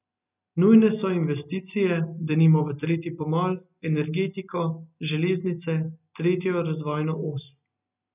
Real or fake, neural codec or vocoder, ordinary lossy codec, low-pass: real; none; none; 3.6 kHz